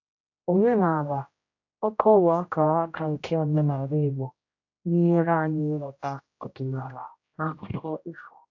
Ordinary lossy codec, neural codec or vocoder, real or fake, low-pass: AAC, 48 kbps; codec, 16 kHz, 0.5 kbps, X-Codec, HuBERT features, trained on general audio; fake; 7.2 kHz